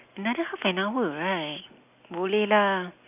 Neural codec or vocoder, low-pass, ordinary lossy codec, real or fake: none; 3.6 kHz; none; real